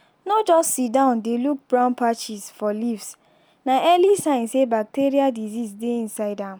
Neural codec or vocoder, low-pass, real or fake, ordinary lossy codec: none; none; real; none